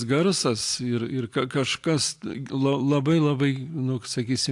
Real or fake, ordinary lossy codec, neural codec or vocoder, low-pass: real; AAC, 64 kbps; none; 10.8 kHz